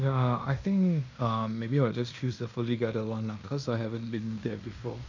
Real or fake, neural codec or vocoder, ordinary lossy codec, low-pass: fake; codec, 16 kHz in and 24 kHz out, 0.9 kbps, LongCat-Audio-Codec, fine tuned four codebook decoder; none; 7.2 kHz